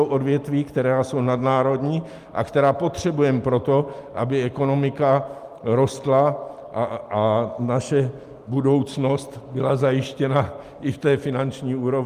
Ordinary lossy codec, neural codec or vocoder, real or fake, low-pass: Opus, 32 kbps; none; real; 14.4 kHz